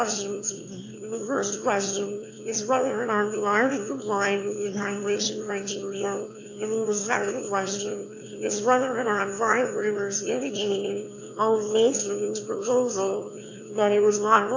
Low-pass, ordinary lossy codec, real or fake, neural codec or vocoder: 7.2 kHz; AAC, 48 kbps; fake; autoencoder, 22.05 kHz, a latent of 192 numbers a frame, VITS, trained on one speaker